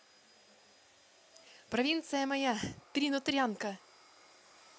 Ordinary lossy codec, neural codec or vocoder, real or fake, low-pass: none; none; real; none